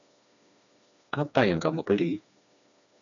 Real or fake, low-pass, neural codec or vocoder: fake; 7.2 kHz; codec, 16 kHz, 2 kbps, FreqCodec, smaller model